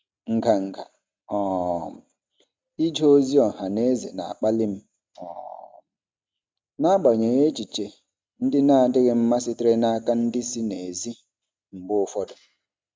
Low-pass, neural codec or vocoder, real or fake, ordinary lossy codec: none; none; real; none